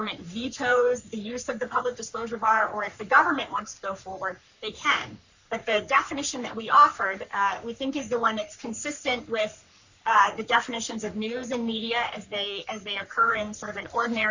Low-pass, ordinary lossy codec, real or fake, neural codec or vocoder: 7.2 kHz; Opus, 64 kbps; fake; codec, 44.1 kHz, 3.4 kbps, Pupu-Codec